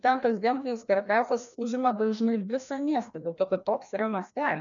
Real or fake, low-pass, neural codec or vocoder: fake; 7.2 kHz; codec, 16 kHz, 1 kbps, FreqCodec, larger model